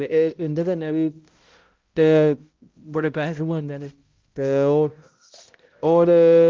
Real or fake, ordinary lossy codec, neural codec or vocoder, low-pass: fake; Opus, 32 kbps; codec, 16 kHz, 0.5 kbps, X-Codec, HuBERT features, trained on balanced general audio; 7.2 kHz